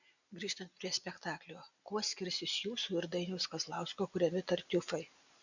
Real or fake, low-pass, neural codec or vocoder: real; 7.2 kHz; none